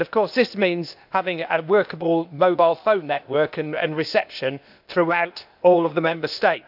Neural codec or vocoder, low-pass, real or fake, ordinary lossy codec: codec, 16 kHz, 0.8 kbps, ZipCodec; 5.4 kHz; fake; none